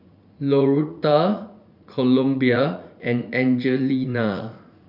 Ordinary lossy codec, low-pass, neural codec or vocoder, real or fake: none; 5.4 kHz; vocoder, 44.1 kHz, 80 mel bands, Vocos; fake